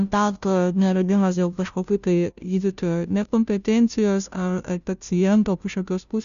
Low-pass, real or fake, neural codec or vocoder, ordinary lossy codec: 7.2 kHz; fake; codec, 16 kHz, 0.5 kbps, FunCodec, trained on Chinese and English, 25 frames a second; MP3, 96 kbps